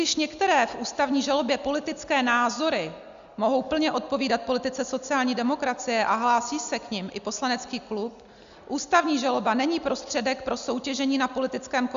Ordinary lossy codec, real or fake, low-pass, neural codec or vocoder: Opus, 64 kbps; real; 7.2 kHz; none